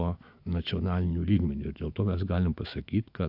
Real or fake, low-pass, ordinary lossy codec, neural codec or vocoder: fake; 5.4 kHz; AAC, 48 kbps; autoencoder, 48 kHz, 128 numbers a frame, DAC-VAE, trained on Japanese speech